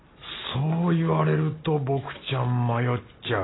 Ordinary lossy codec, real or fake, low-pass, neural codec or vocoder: AAC, 16 kbps; real; 7.2 kHz; none